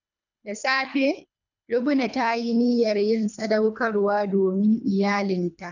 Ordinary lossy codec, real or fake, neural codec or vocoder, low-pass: none; fake; codec, 24 kHz, 3 kbps, HILCodec; 7.2 kHz